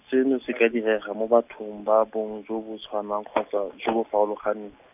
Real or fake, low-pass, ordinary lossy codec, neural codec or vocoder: real; 3.6 kHz; none; none